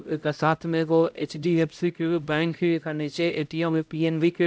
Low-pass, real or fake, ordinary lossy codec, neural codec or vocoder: none; fake; none; codec, 16 kHz, 0.5 kbps, X-Codec, HuBERT features, trained on LibriSpeech